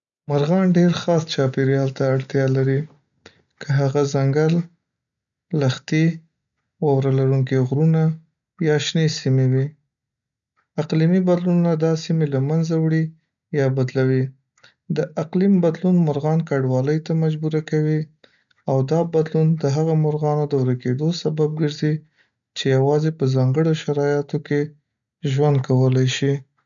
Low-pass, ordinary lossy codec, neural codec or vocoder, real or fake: 7.2 kHz; none; none; real